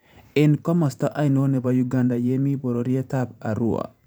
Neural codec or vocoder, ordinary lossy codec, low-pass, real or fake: vocoder, 44.1 kHz, 128 mel bands every 512 samples, BigVGAN v2; none; none; fake